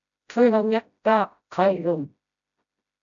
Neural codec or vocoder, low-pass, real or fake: codec, 16 kHz, 0.5 kbps, FreqCodec, smaller model; 7.2 kHz; fake